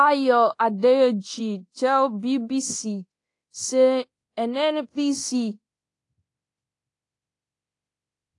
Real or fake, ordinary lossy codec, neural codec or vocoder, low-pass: fake; AAC, 48 kbps; codec, 24 kHz, 0.9 kbps, DualCodec; 10.8 kHz